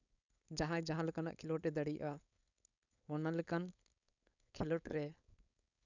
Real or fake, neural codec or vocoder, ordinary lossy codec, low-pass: fake; codec, 16 kHz, 4.8 kbps, FACodec; none; 7.2 kHz